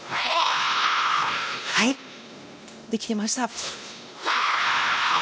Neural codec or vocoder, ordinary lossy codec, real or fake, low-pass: codec, 16 kHz, 1 kbps, X-Codec, WavLM features, trained on Multilingual LibriSpeech; none; fake; none